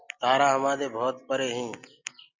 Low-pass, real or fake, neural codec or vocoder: 7.2 kHz; real; none